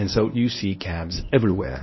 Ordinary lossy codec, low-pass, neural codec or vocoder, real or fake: MP3, 24 kbps; 7.2 kHz; codec, 24 kHz, 0.9 kbps, WavTokenizer, medium speech release version 1; fake